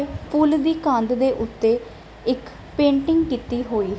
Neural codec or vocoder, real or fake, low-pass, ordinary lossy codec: none; real; none; none